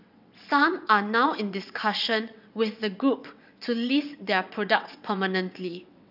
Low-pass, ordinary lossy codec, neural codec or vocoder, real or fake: 5.4 kHz; none; vocoder, 22.05 kHz, 80 mel bands, WaveNeXt; fake